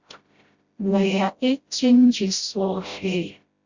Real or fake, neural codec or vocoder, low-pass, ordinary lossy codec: fake; codec, 16 kHz, 0.5 kbps, FreqCodec, smaller model; 7.2 kHz; Opus, 64 kbps